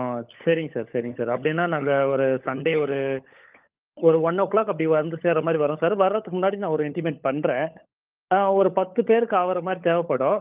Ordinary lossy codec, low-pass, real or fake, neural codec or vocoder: Opus, 32 kbps; 3.6 kHz; fake; codec, 16 kHz, 16 kbps, FunCodec, trained on LibriTTS, 50 frames a second